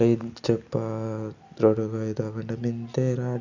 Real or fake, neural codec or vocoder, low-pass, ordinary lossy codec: real; none; 7.2 kHz; none